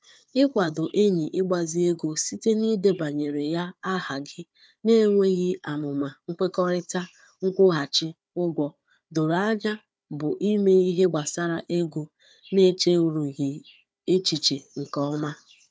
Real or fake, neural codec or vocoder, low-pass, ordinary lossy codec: fake; codec, 16 kHz, 4 kbps, FunCodec, trained on Chinese and English, 50 frames a second; none; none